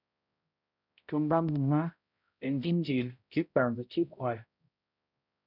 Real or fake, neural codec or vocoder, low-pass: fake; codec, 16 kHz, 0.5 kbps, X-Codec, HuBERT features, trained on balanced general audio; 5.4 kHz